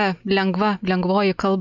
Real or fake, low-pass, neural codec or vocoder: real; 7.2 kHz; none